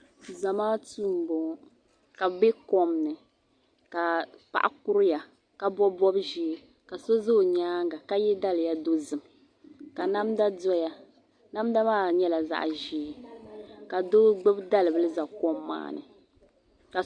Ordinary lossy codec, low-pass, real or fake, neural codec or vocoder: Opus, 64 kbps; 9.9 kHz; real; none